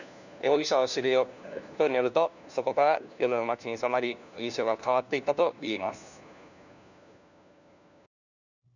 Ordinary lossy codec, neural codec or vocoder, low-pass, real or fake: none; codec, 16 kHz, 1 kbps, FunCodec, trained on LibriTTS, 50 frames a second; 7.2 kHz; fake